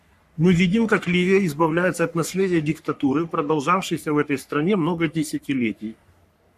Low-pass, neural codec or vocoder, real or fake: 14.4 kHz; codec, 44.1 kHz, 3.4 kbps, Pupu-Codec; fake